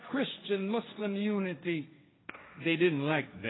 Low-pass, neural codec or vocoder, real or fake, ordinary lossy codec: 7.2 kHz; codec, 16 kHz, 1.1 kbps, Voila-Tokenizer; fake; AAC, 16 kbps